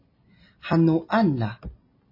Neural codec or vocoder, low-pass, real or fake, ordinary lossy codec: none; 5.4 kHz; real; MP3, 24 kbps